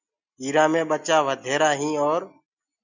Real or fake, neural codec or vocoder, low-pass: real; none; 7.2 kHz